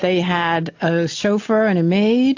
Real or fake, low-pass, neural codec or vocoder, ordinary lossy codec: real; 7.2 kHz; none; AAC, 48 kbps